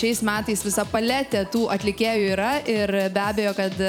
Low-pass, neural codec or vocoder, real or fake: 19.8 kHz; vocoder, 44.1 kHz, 128 mel bands every 256 samples, BigVGAN v2; fake